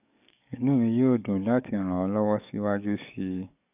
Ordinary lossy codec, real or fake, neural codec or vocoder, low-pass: none; fake; codec, 44.1 kHz, 7.8 kbps, DAC; 3.6 kHz